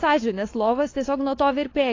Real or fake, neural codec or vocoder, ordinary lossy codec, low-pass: fake; codec, 16 kHz, 4.8 kbps, FACodec; AAC, 32 kbps; 7.2 kHz